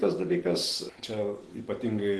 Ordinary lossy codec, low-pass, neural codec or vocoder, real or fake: Opus, 16 kbps; 10.8 kHz; none; real